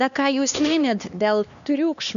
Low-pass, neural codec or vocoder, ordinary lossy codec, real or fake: 7.2 kHz; codec, 16 kHz, 2 kbps, X-Codec, HuBERT features, trained on LibriSpeech; MP3, 96 kbps; fake